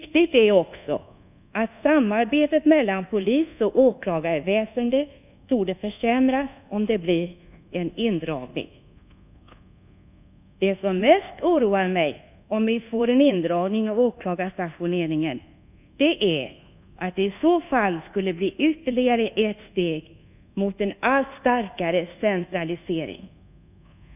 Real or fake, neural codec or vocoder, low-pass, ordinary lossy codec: fake; codec, 24 kHz, 1.2 kbps, DualCodec; 3.6 kHz; none